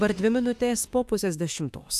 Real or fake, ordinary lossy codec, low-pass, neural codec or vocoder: fake; AAC, 96 kbps; 14.4 kHz; autoencoder, 48 kHz, 32 numbers a frame, DAC-VAE, trained on Japanese speech